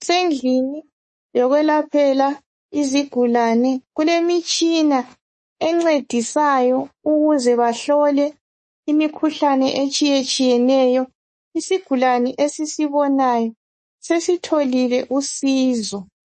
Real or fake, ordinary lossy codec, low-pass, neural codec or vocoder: fake; MP3, 32 kbps; 10.8 kHz; codec, 24 kHz, 3.1 kbps, DualCodec